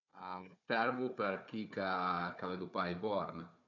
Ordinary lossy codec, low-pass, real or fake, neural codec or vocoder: none; 7.2 kHz; fake; codec, 16 kHz, 4 kbps, FunCodec, trained on Chinese and English, 50 frames a second